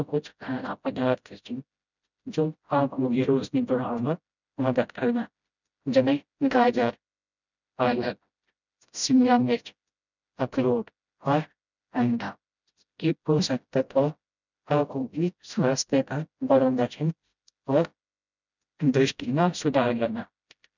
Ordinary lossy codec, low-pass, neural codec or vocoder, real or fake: none; 7.2 kHz; codec, 16 kHz, 0.5 kbps, FreqCodec, smaller model; fake